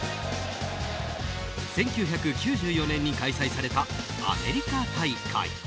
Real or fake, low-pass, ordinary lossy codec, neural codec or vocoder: real; none; none; none